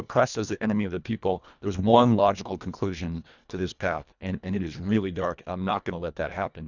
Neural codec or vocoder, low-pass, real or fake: codec, 24 kHz, 1.5 kbps, HILCodec; 7.2 kHz; fake